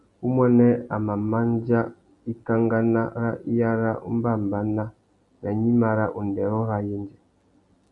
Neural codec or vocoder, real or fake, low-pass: none; real; 10.8 kHz